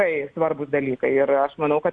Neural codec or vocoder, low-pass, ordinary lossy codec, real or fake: none; 9.9 kHz; Opus, 64 kbps; real